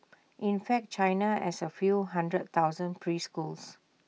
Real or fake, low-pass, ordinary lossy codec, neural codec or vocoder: real; none; none; none